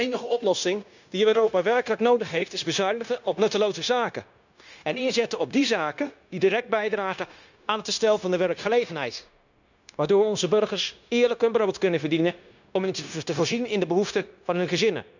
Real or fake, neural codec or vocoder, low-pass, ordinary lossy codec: fake; codec, 16 kHz, 0.9 kbps, LongCat-Audio-Codec; 7.2 kHz; none